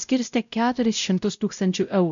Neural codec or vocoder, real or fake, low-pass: codec, 16 kHz, 0.5 kbps, X-Codec, WavLM features, trained on Multilingual LibriSpeech; fake; 7.2 kHz